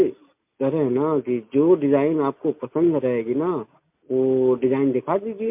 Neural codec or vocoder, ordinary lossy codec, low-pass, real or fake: none; MP3, 32 kbps; 3.6 kHz; real